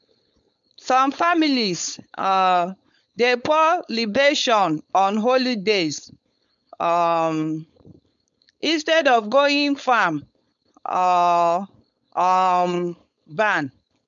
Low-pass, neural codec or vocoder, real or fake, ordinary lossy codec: 7.2 kHz; codec, 16 kHz, 4.8 kbps, FACodec; fake; none